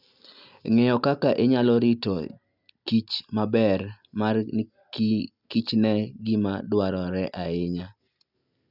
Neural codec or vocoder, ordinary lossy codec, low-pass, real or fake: none; none; 5.4 kHz; real